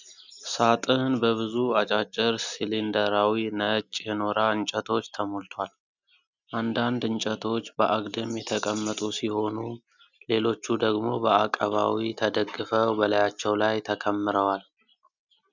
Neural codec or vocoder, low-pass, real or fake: none; 7.2 kHz; real